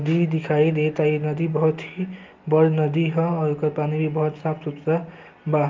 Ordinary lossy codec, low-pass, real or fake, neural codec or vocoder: Opus, 32 kbps; 7.2 kHz; real; none